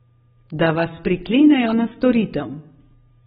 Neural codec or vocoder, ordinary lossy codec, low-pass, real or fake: none; AAC, 16 kbps; 19.8 kHz; real